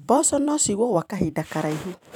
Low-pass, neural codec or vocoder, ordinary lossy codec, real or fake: 19.8 kHz; vocoder, 44.1 kHz, 128 mel bands every 256 samples, BigVGAN v2; none; fake